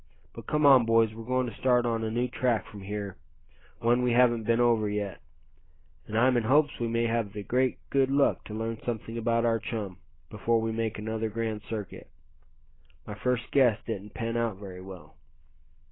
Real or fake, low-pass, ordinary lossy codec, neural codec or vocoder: real; 7.2 kHz; AAC, 16 kbps; none